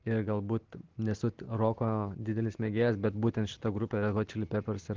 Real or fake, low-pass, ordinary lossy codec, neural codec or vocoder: real; 7.2 kHz; Opus, 16 kbps; none